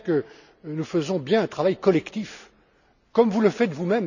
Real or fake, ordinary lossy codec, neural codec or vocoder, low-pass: real; none; none; 7.2 kHz